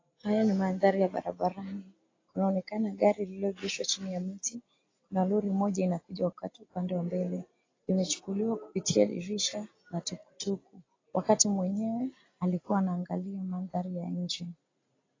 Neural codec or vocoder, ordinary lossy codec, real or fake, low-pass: none; AAC, 32 kbps; real; 7.2 kHz